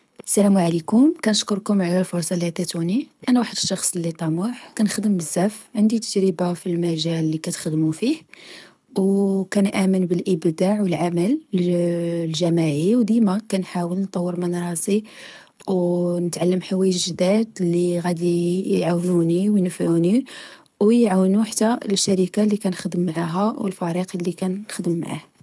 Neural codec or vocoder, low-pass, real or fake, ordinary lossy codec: codec, 24 kHz, 6 kbps, HILCodec; none; fake; none